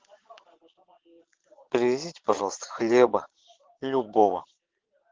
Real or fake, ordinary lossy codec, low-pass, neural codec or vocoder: fake; Opus, 16 kbps; 7.2 kHz; vocoder, 22.05 kHz, 80 mel bands, Vocos